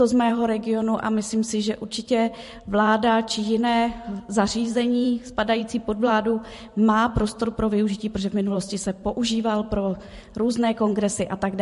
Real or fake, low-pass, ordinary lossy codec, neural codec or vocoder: fake; 14.4 kHz; MP3, 48 kbps; vocoder, 44.1 kHz, 128 mel bands every 512 samples, BigVGAN v2